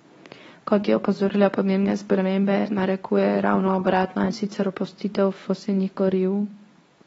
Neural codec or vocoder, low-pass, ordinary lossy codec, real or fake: codec, 24 kHz, 0.9 kbps, WavTokenizer, medium speech release version 2; 10.8 kHz; AAC, 24 kbps; fake